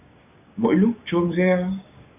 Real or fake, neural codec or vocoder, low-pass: fake; codec, 16 kHz, 6 kbps, DAC; 3.6 kHz